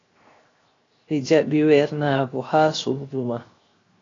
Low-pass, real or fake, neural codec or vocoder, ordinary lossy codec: 7.2 kHz; fake; codec, 16 kHz, 0.7 kbps, FocalCodec; AAC, 32 kbps